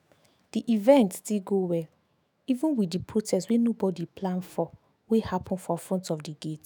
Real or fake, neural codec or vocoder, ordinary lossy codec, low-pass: fake; autoencoder, 48 kHz, 128 numbers a frame, DAC-VAE, trained on Japanese speech; none; none